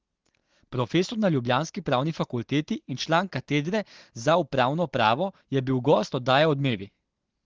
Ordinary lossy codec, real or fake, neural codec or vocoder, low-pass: Opus, 16 kbps; real; none; 7.2 kHz